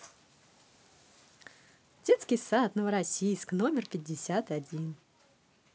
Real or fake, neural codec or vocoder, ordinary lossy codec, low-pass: real; none; none; none